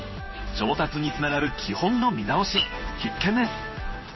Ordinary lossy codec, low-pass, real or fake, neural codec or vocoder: MP3, 24 kbps; 7.2 kHz; fake; codec, 16 kHz in and 24 kHz out, 1 kbps, XY-Tokenizer